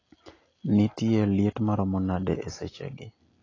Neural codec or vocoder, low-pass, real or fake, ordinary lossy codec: none; 7.2 kHz; real; AAC, 32 kbps